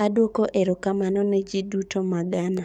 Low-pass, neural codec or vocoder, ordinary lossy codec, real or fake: 19.8 kHz; codec, 44.1 kHz, 7.8 kbps, DAC; none; fake